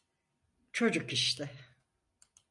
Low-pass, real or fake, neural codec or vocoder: 10.8 kHz; real; none